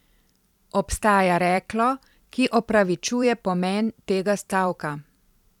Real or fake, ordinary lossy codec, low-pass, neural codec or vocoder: fake; none; 19.8 kHz; vocoder, 44.1 kHz, 128 mel bands every 512 samples, BigVGAN v2